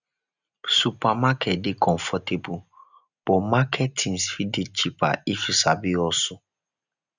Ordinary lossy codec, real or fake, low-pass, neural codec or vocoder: none; real; 7.2 kHz; none